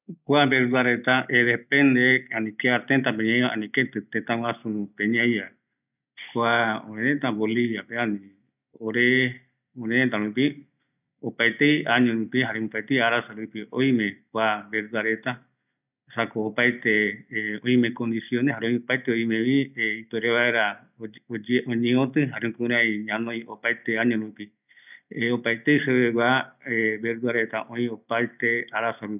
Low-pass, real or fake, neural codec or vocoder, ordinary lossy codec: 3.6 kHz; real; none; none